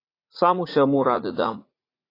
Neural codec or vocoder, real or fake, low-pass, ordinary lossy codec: vocoder, 44.1 kHz, 80 mel bands, Vocos; fake; 5.4 kHz; AAC, 32 kbps